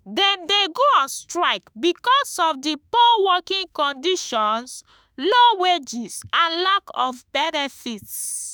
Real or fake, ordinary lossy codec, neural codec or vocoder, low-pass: fake; none; autoencoder, 48 kHz, 32 numbers a frame, DAC-VAE, trained on Japanese speech; none